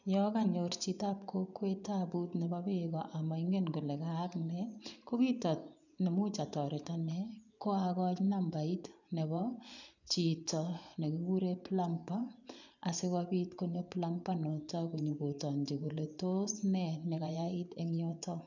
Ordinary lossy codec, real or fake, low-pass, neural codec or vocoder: none; fake; 7.2 kHz; vocoder, 24 kHz, 100 mel bands, Vocos